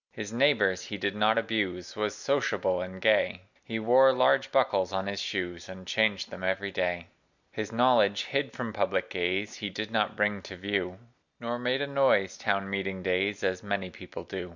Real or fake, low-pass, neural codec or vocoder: real; 7.2 kHz; none